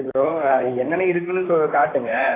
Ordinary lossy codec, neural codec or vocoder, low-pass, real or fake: AAC, 16 kbps; vocoder, 44.1 kHz, 128 mel bands, Pupu-Vocoder; 3.6 kHz; fake